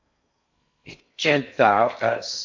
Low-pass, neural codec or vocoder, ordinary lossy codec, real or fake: 7.2 kHz; codec, 16 kHz in and 24 kHz out, 0.6 kbps, FocalCodec, streaming, 4096 codes; MP3, 48 kbps; fake